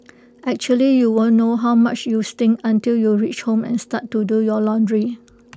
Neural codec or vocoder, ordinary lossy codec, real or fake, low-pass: none; none; real; none